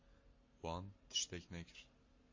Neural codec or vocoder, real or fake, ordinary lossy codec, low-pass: none; real; MP3, 32 kbps; 7.2 kHz